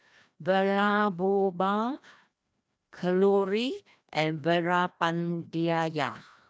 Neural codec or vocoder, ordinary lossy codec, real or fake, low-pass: codec, 16 kHz, 1 kbps, FreqCodec, larger model; none; fake; none